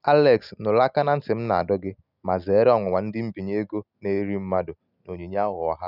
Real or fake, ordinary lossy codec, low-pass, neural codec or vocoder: real; none; 5.4 kHz; none